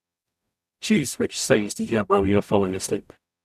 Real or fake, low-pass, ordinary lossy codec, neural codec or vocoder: fake; 14.4 kHz; none; codec, 44.1 kHz, 0.9 kbps, DAC